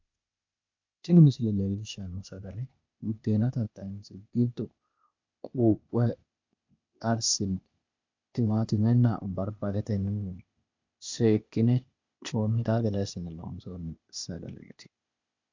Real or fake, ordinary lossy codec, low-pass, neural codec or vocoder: fake; MP3, 64 kbps; 7.2 kHz; codec, 16 kHz, 0.8 kbps, ZipCodec